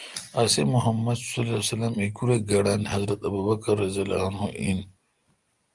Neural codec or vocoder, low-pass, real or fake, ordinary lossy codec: none; 10.8 kHz; real; Opus, 32 kbps